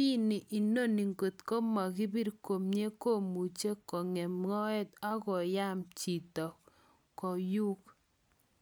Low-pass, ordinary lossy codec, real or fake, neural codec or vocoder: none; none; real; none